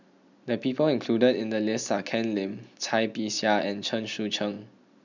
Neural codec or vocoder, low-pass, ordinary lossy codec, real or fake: none; 7.2 kHz; none; real